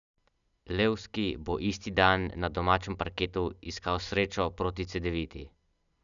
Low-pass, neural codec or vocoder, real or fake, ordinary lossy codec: 7.2 kHz; none; real; none